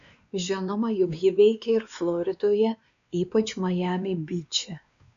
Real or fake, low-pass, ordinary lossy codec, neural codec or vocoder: fake; 7.2 kHz; MP3, 64 kbps; codec, 16 kHz, 2 kbps, X-Codec, WavLM features, trained on Multilingual LibriSpeech